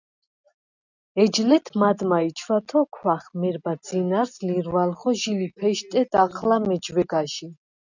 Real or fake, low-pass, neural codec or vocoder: real; 7.2 kHz; none